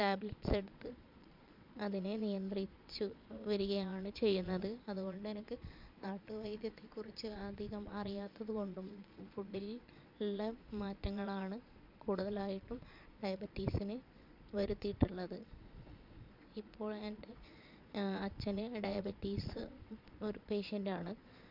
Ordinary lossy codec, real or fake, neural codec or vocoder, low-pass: MP3, 48 kbps; fake; vocoder, 22.05 kHz, 80 mel bands, Vocos; 5.4 kHz